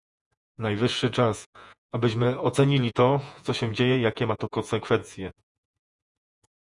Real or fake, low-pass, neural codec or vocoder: fake; 10.8 kHz; vocoder, 48 kHz, 128 mel bands, Vocos